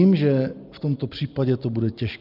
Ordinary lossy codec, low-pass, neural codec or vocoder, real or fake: Opus, 24 kbps; 5.4 kHz; none; real